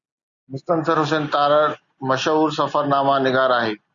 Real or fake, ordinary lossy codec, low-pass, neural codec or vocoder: real; Opus, 64 kbps; 7.2 kHz; none